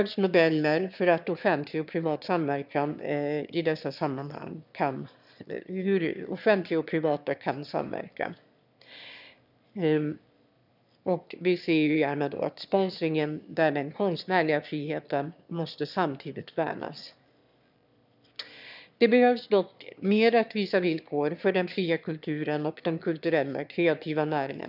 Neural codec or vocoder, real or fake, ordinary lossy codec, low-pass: autoencoder, 22.05 kHz, a latent of 192 numbers a frame, VITS, trained on one speaker; fake; none; 5.4 kHz